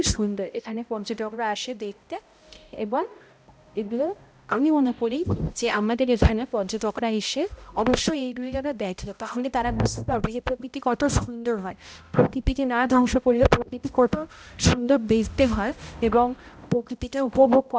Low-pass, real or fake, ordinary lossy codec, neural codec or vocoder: none; fake; none; codec, 16 kHz, 0.5 kbps, X-Codec, HuBERT features, trained on balanced general audio